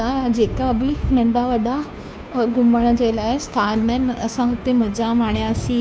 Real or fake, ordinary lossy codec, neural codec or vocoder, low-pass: fake; none; codec, 16 kHz, 2 kbps, FunCodec, trained on Chinese and English, 25 frames a second; none